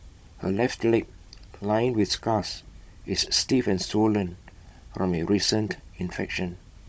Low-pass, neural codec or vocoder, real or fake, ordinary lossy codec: none; codec, 16 kHz, 16 kbps, FunCodec, trained on Chinese and English, 50 frames a second; fake; none